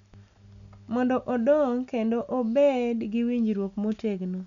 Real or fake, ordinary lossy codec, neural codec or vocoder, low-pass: real; none; none; 7.2 kHz